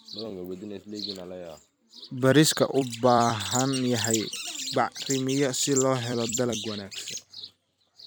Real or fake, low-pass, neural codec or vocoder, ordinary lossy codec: fake; none; vocoder, 44.1 kHz, 128 mel bands every 256 samples, BigVGAN v2; none